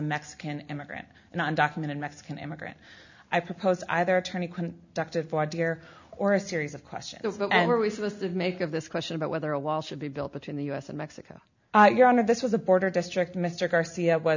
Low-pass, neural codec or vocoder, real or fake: 7.2 kHz; none; real